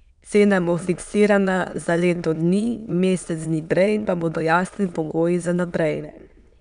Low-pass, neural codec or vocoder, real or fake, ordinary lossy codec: 9.9 kHz; autoencoder, 22.05 kHz, a latent of 192 numbers a frame, VITS, trained on many speakers; fake; none